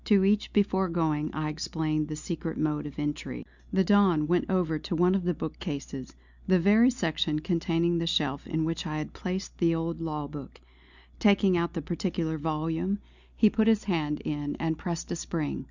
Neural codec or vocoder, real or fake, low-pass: none; real; 7.2 kHz